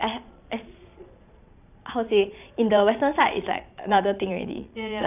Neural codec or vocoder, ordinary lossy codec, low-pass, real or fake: vocoder, 22.05 kHz, 80 mel bands, Vocos; none; 3.6 kHz; fake